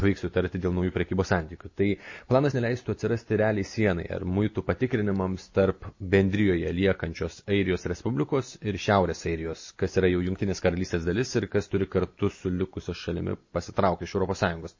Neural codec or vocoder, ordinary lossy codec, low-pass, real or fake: none; MP3, 32 kbps; 7.2 kHz; real